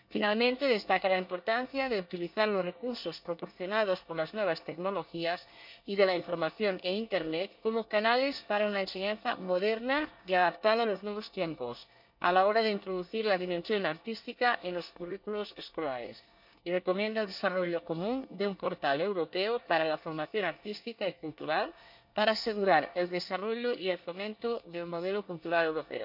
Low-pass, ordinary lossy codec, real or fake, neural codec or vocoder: 5.4 kHz; none; fake; codec, 24 kHz, 1 kbps, SNAC